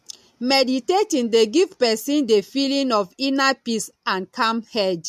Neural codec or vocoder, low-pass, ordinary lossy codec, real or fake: none; 14.4 kHz; MP3, 64 kbps; real